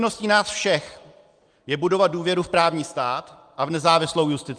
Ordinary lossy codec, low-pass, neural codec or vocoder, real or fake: Opus, 32 kbps; 9.9 kHz; none; real